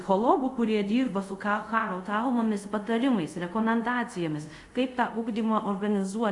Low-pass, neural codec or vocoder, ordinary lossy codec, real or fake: 10.8 kHz; codec, 24 kHz, 0.5 kbps, DualCodec; Opus, 64 kbps; fake